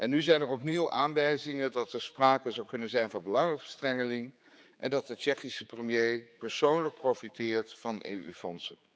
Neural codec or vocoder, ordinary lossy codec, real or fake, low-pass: codec, 16 kHz, 4 kbps, X-Codec, HuBERT features, trained on general audio; none; fake; none